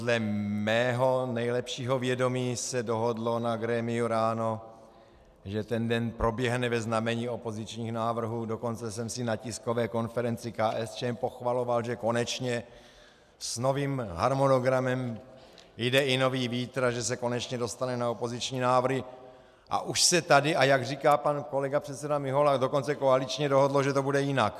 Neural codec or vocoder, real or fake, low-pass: none; real; 14.4 kHz